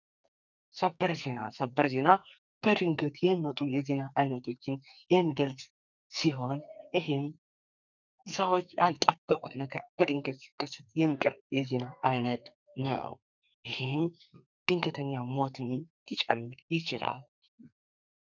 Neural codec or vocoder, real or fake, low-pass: codec, 44.1 kHz, 2.6 kbps, SNAC; fake; 7.2 kHz